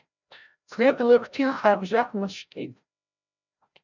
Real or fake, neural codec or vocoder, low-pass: fake; codec, 16 kHz, 0.5 kbps, FreqCodec, larger model; 7.2 kHz